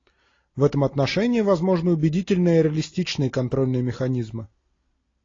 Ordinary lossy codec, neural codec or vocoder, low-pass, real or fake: AAC, 32 kbps; none; 7.2 kHz; real